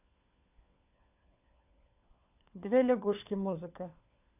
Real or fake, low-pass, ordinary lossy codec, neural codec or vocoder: fake; 3.6 kHz; none; codec, 16 kHz, 4 kbps, FunCodec, trained on LibriTTS, 50 frames a second